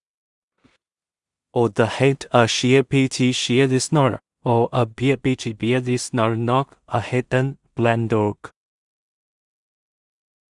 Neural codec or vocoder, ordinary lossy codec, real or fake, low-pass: codec, 16 kHz in and 24 kHz out, 0.4 kbps, LongCat-Audio-Codec, two codebook decoder; Opus, 64 kbps; fake; 10.8 kHz